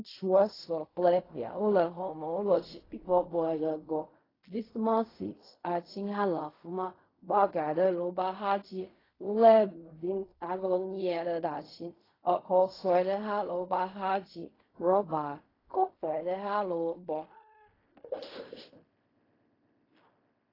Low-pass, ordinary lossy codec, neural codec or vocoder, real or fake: 5.4 kHz; AAC, 24 kbps; codec, 16 kHz in and 24 kHz out, 0.4 kbps, LongCat-Audio-Codec, fine tuned four codebook decoder; fake